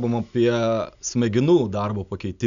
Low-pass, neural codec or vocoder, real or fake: 7.2 kHz; none; real